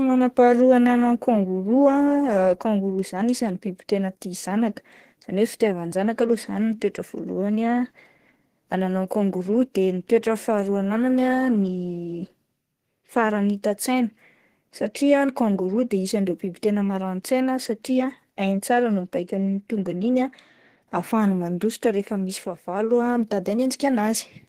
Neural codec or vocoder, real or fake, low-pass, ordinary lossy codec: codec, 32 kHz, 1.9 kbps, SNAC; fake; 14.4 kHz; Opus, 16 kbps